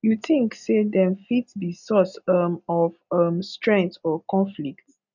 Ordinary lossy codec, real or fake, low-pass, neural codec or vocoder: none; real; 7.2 kHz; none